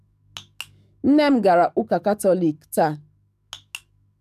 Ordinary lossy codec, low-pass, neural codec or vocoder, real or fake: none; 14.4 kHz; codec, 44.1 kHz, 7.8 kbps, DAC; fake